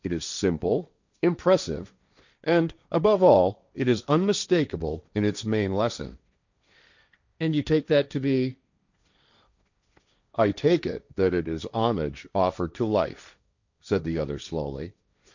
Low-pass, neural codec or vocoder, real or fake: 7.2 kHz; codec, 16 kHz, 1.1 kbps, Voila-Tokenizer; fake